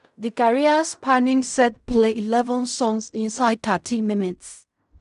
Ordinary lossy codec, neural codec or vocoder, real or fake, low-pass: none; codec, 16 kHz in and 24 kHz out, 0.4 kbps, LongCat-Audio-Codec, fine tuned four codebook decoder; fake; 10.8 kHz